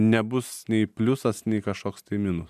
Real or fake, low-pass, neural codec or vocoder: real; 14.4 kHz; none